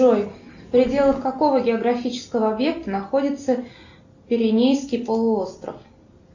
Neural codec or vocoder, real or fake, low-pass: none; real; 7.2 kHz